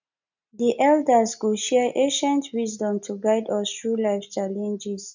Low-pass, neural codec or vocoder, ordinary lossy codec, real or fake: 7.2 kHz; none; none; real